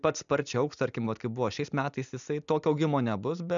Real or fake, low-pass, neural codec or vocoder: real; 7.2 kHz; none